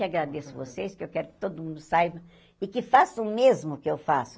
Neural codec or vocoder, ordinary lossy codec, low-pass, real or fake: none; none; none; real